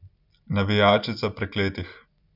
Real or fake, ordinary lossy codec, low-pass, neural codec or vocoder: real; none; 5.4 kHz; none